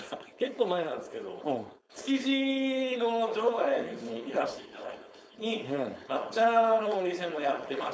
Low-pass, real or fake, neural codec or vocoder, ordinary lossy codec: none; fake; codec, 16 kHz, 4.8 kbps, FACodec; none